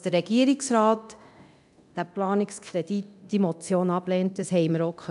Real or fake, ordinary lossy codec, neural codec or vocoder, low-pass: fake; none; codec, 24 kHz, 0.9 kbps, DualCodec; 10.8 kHz